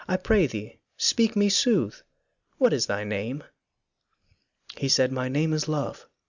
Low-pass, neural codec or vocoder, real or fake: 7.2 kHz; none; real